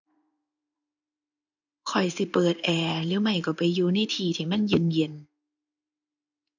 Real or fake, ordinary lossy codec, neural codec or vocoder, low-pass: fake; none; codec, 16 kHz in and 24 kHz out, 1 kbps, XY-Tokenizer; 7.2 kHz